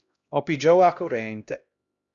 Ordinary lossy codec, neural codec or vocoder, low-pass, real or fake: Opus, 64 kbps; codec, 16 kHz, 1 kbps, X-Codec, HuBERT features, trained on LibriSpeech; 7.2 kHz; fake